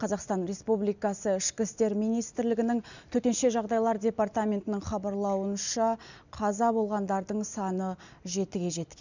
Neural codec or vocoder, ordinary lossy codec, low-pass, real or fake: none; none; 7.2 kHz; real